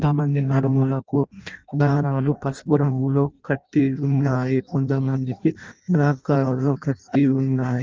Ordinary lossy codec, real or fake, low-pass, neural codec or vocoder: Opus, 24 kbps; fake; 7.2 kHz; codec, 16 kHz in and 24 kHz out, 0.6 kbps, FireRedTTS-2 codec